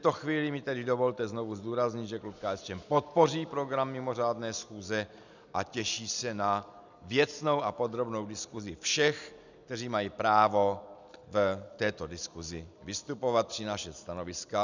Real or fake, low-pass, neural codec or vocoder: real; 7.2 kHz; none